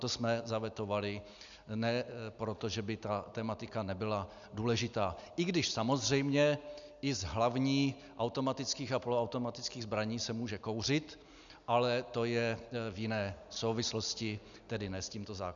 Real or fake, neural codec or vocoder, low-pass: real; none; 7.2 kHz